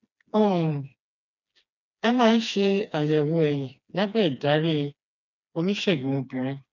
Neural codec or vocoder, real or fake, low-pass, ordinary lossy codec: codec, 16 kHz, 2 kbps, FreqCodec, smaller model; fake; 7.2 kHz; none